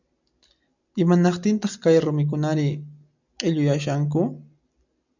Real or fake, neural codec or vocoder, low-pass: real; none; 7.2 kHz